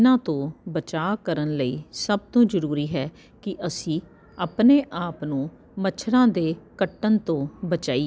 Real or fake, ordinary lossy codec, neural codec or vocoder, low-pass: real; none; none; none